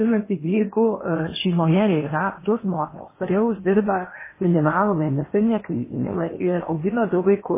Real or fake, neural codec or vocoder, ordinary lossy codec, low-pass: fake; codec, 16 kHz in and 24 kHz out, 0.8 kbps, FocalCodec, streaming, 65536 codes; MP3, 16 kbps; 3.6 kHz